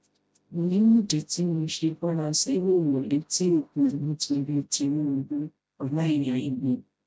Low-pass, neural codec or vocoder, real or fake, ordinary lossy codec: none; codec, 16 kHz, 0.5 kbps, FreqCodec, smaller model; fake; none